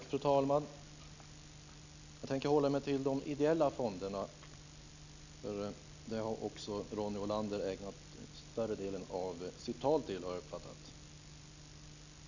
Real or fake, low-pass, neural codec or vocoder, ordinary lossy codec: real; 7.2 kHz; none; none